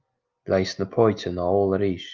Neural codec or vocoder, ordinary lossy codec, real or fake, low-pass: none; Opus, 24 kbps; real; 7.2 kHz